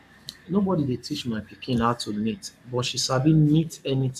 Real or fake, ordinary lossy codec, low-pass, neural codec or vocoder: fake; none; 14.4 kHz; codec, 44.1 kHz, 7.8 kbps, Pupu-Codec